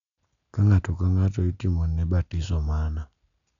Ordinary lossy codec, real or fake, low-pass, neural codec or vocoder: none; real; 7.2 kHz; none